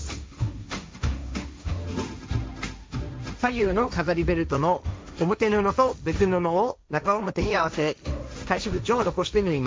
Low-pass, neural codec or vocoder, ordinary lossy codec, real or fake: none; codec, 16 kHz, 1.1 kbps, Voila-Tokenizer; none; fake